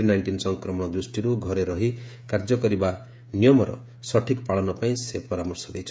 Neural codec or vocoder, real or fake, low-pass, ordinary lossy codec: codec, 16 kHz, 16 kbps, FreqCodec, smaller model; fake; none; none